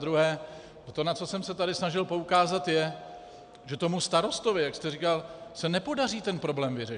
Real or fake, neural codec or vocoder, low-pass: real; none; 9.9 kHz